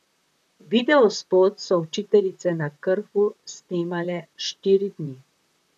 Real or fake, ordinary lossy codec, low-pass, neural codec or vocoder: fake; none; 14.4 kHz; vocoder, 44.1 kHz, 128 mel bands, Pupu-Vocoder